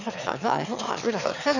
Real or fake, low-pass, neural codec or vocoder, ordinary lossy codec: fake; 7.2 kHz; autoencoder, 22.05 kHz, a latent of 192 numbers a frame, VITS, trained on one speaker; none